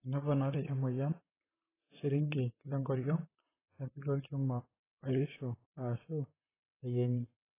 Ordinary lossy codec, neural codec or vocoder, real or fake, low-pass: AAC, 16 kbps; vocoder, 44.1 kHz, 128 mel bands, Pupu-Vocoder; fake; 3.6 kHz